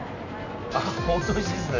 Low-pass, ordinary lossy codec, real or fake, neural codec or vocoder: 7.2 kHz; none; real; none